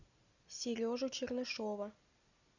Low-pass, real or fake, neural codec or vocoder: 7.2 kHz; real; none